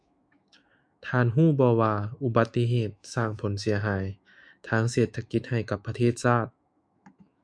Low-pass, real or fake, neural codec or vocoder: 9.9 kHz; fake; autoencoder, 48 kHz, 128 numbers a frame, DAC-VAE, trained on Japanese speech